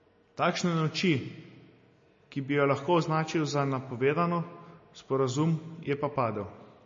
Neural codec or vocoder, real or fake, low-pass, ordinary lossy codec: none; real; 7.2 kHz; MP3, 32 kbps